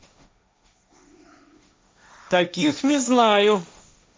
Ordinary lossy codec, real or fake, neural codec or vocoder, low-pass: none; fake; codec, 16 kHz, 1.1 kbps, Voila-Tokenizer; none